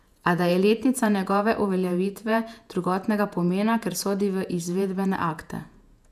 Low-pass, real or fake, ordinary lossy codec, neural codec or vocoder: 14.4 kHz; fake; none; vocoder, 48 kHz, 128 mel bands, Vocos